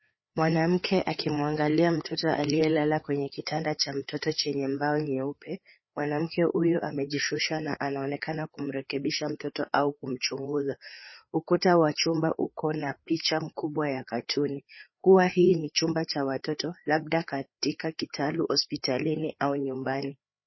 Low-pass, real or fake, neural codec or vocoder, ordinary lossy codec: 7.2 kHz; fake; codec, 16 kHz, 4 kbps, FreqCodec, larger model; MP3, 24 kbps